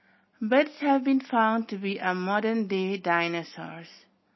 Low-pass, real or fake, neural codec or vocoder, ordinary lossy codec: 7.2 kHz; real; none; MP3, 24 kbps